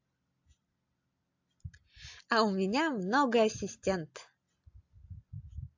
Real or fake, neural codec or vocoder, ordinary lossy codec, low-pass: real; none; AAC, 48 kbps; 7.2 kHz